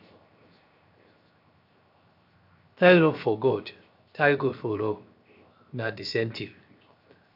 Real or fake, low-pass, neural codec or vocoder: fake; 5.4 kHz; codec, 16 kHz, 0.7 kbps, FocalCodec